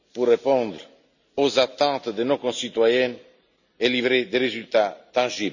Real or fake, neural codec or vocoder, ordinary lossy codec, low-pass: real; none; none; 7.2 kHz